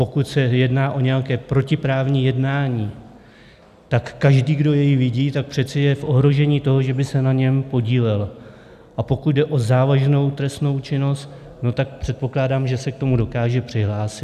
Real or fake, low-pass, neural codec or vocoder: real; 14.4 kHz; none